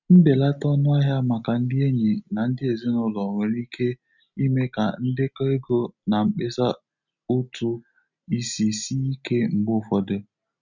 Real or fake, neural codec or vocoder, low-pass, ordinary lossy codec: real; none; 7.2 kHz; none